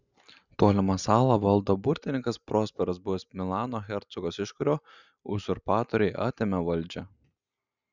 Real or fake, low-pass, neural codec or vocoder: real; 7.2 kHz; none